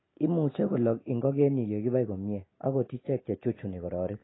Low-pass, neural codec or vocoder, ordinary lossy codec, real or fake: 7.2 kHz; none; AAC, 16 kbps; real